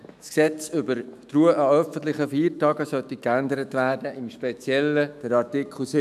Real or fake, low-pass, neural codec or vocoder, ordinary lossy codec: fake; 14.4 kHz; autoencoder, 48 kHz, 128 numbers a frame, DAC-VAE, trained on Japanese speech; none